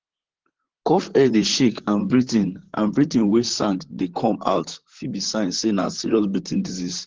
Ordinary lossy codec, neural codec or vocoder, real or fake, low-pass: Opus, 16 kbps; vocoder, 44.1 kHz, 128 mel bands, Pupu-Vocoder; fake; 7.2 kHz